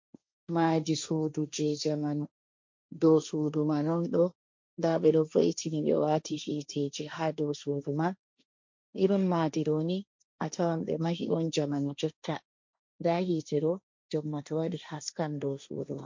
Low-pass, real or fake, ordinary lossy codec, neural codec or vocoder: 7.2 kHz; fake; MP3, 48 kbps; codec, 16 kHz, 1.1 kbps, Voila-Tokenizer